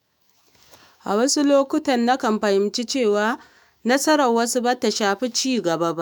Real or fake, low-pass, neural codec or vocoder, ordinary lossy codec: fake; none; autoencoder, 48 kHz, 128 numbers a frame, DAC-VAE, trained on Japanese speech; none